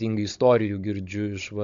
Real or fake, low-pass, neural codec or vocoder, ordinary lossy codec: fake; 7.2 kHz; codec, 16 kHz, 16 kbps, FunCodec, trained on Chinese and English, 50 frames a second; MP3, 64 kbps